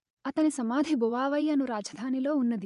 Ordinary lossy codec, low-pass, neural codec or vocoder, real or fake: none; 10.8 kHz; vocoder, 24 kHz, 100 mel bands, Vocos; fake